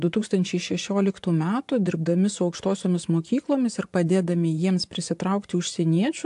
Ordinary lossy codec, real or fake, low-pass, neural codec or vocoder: AAC, 64 kbps; fake; 10.8 kHz; vocoder, 24 kHz, 100 mel bands, Vocos